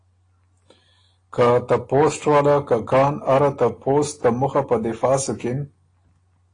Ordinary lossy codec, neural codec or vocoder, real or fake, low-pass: AAC, 32 kbps; none; real; 9.9 kHz